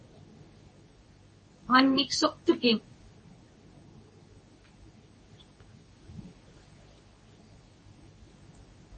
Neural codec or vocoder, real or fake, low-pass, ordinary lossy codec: codec, 24 kHz, 0.9 kbps, WavTokenizer, medium speech release version 2; fake; 10.8 kHz; MP3, 32 kbps